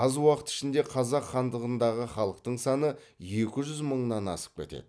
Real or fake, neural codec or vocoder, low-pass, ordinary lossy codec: real; none; none; none